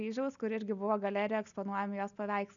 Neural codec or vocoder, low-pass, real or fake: none; 7.2 kHz; real